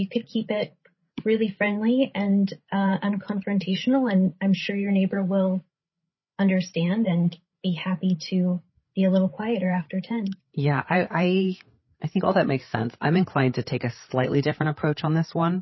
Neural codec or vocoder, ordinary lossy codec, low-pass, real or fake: codec, 16 kHz, 8 kbps, FreqCodec, larger model; MP3, 24 kbps; 7.2 kHz; fake